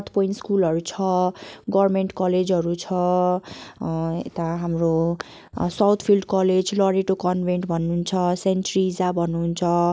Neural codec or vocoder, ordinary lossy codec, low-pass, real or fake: none; none; none; real